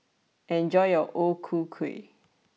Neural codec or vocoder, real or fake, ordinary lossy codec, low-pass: none; real; none; none